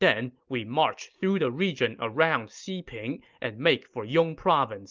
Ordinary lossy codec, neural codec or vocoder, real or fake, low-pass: Opus, 24 kbps; none; real; 7.2 kHz